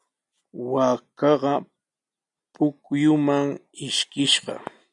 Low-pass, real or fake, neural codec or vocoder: 10.8 kHz; real; none